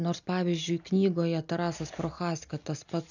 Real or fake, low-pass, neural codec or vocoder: real; 7.2 kHz; none